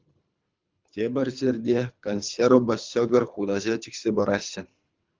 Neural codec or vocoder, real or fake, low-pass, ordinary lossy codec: codec, 24 kHz, 3 kbps, HILCodec; fake; 7.2 kHz; Opus, 24 kbps